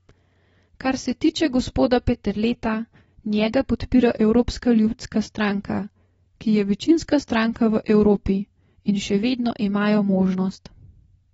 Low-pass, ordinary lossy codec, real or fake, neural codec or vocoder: 19.8 kHz; AAC, 24 kbps; real; none